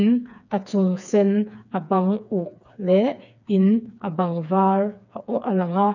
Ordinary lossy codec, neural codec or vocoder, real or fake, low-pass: none; codec, 16 kHz, 4 kbps, FreqCodec, smaller model; fake; 7.2 kHz